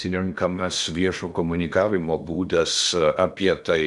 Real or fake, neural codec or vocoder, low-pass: fake; codec, 16 kHz in and 24 kHz out, 0.8 kbps, FocalCodec, streaming, 65536 codes; 10.8 kHz